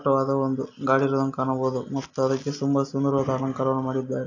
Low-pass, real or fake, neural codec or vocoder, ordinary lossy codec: 7.2 kHz; real; none; AAC, 48 kbps